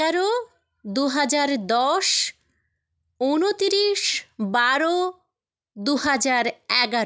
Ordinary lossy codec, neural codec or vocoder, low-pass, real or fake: none; none; none; real